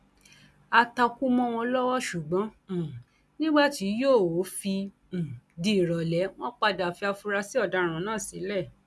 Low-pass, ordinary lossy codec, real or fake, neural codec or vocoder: none; none; real; none